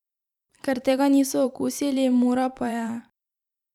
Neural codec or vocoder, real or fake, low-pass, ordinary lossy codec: vocoder, 44.1 kHz, 128 mel bands every 512 samples, BigVGAN v2; fake; 19.8 kHz; none